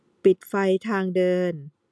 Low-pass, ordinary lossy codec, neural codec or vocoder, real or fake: none; none; none; real